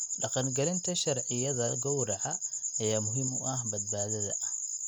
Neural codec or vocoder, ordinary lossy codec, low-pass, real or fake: none; none; 19.8 kHz; real